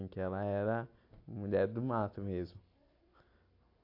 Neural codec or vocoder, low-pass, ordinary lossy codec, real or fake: none; 5.4 kHz; AAC, 32 kbps; real